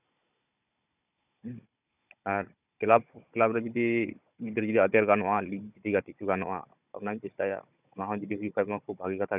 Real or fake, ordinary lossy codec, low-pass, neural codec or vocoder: fake; none; 3.6 kHz; codec, 16 kHz, 16 kbps, FunCodec, trained on Chinese and English, 50 frames a second